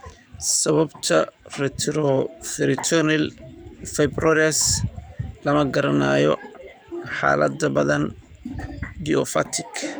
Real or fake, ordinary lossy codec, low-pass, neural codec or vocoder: fake; none; none; codec, 44.1 kHz, 7.8 kbps, DAC